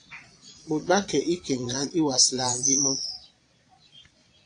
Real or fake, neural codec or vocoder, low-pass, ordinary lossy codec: fake; vocoder, 22.05 kHz, 80 mel bands, Vocos; 9.9 kHz; AAC, 48 kbps